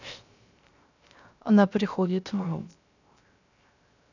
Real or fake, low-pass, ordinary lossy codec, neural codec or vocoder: fake; 7.2 kHz; none; codec, 16 kHz, 0.3 kbps, FocalCodec